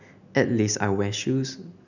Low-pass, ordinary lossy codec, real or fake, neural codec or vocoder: 7.2 kHz; none; real; none